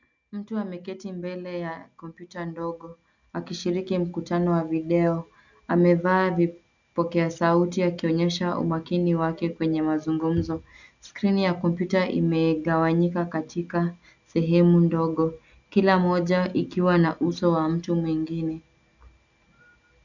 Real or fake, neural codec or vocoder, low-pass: real; none; 7.2 kHz